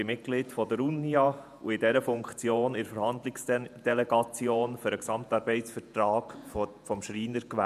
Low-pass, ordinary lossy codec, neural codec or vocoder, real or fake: 14.4 kHz; none; vocoder, 48 kHz, 128 mel bands, Vocos; fake